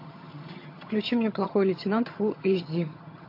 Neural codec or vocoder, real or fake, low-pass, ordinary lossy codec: vocoder, 22.05 kHz, 80 mel bands, HiFi-GAN; fake; 5.4 kHz; MP3, 32 kbps